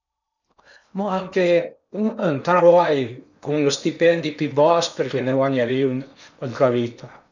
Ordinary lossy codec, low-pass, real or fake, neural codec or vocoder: none; 7.2 kHz; fake; codec, 16 kHz in and 24 kHz out, 0.8 kbps, FocalCodec, streaming, 65536 codes